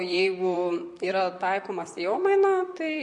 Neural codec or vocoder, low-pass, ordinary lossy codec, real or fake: vocoder, 44.1 kHz, 128 mel bands, Pupu-Vocoder; 10.8 kHz; MP3, 48 kbps; fake